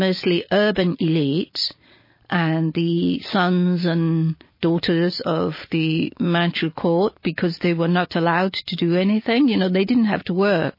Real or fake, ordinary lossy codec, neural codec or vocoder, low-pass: real; MP3, 24 kbps; none; 5.4 kHz